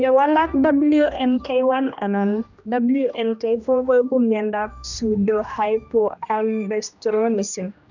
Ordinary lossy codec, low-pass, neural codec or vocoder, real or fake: none; 7.2 kHz; codec, 16 kHz, 1 kbps, X-Codec, HuBERT features, trained on general audio; fake